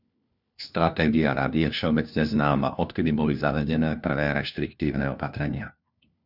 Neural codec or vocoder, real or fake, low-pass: codec, 16 kHz, 1 kbps, FunCodec, trained on LibriTTS, 50 frames a second; fake; 5.4 kHz